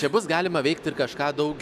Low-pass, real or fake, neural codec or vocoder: 14.4 kHz; real; none